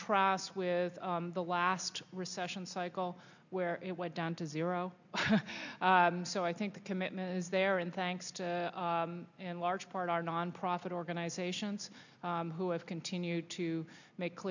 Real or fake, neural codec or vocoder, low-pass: real; none; 7.2 kHz